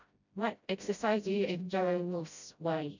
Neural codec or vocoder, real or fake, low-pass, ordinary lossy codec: codec, 16 kHz, 0.5 kbps, FreqCodec, smaller model; fake; 7.2 kHz; none